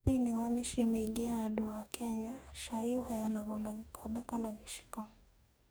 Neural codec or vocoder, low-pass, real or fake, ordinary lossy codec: codec, 44.1 kHz, 2.6 kbps, DAC; none; fake; none